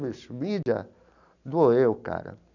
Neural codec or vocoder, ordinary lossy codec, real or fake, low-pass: none; none; real; 7.2 kHz